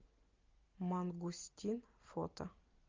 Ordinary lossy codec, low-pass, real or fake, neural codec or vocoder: Opus, 24 kbps; 7.2 kHz; real; none